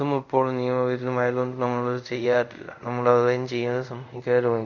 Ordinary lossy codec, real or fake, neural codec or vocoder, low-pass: none; fake; codec, 24 kHz, 0.5 kbps, DualCodec; 7.2 kHz